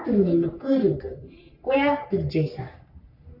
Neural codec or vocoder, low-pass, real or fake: codec, 44.1 kHz, 3.4 kbps, Pupu-Codec; 5.4 kHz; fake